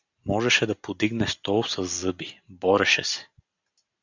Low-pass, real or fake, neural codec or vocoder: 7.2 kHz; real; none